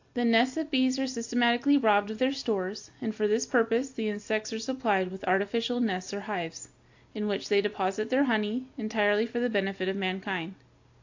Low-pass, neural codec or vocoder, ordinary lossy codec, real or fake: 7.2 kHz; none; AAC, 48 kbps; real